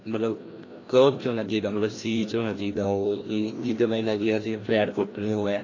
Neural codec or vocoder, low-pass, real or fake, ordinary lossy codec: codec, 16 kHz, 1 kbps, FreqCodec, larger model; 7.2 kHz; fake; AAC, 32 kbps